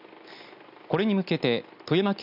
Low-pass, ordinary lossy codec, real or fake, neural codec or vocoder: 5.4 kHz; none; real; none